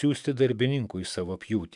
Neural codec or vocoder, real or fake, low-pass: autoencoder, 48 kHz, 128 numbers a frame, DAC-VAE, trained on Japanese speech; fake; 10.8 kHz